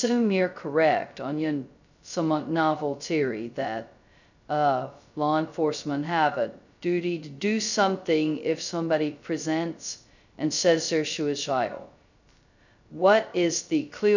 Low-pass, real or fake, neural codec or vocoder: 7.2 kHz; fake; codec, 16 kHz, 0.2 kbps, FocalCodec